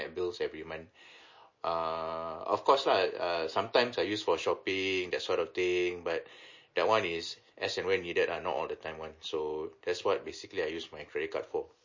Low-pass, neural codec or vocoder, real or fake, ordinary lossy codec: 7.2 kHz; none; real; MP3, 32 kbps